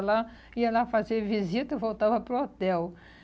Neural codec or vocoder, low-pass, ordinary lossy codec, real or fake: none; none; none; real